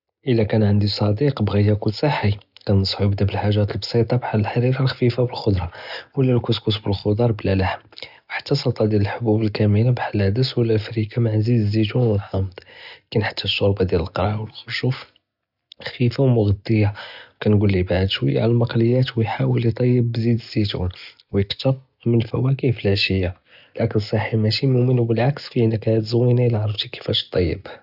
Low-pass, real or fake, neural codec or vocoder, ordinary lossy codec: 5.4 kHz; real; none; none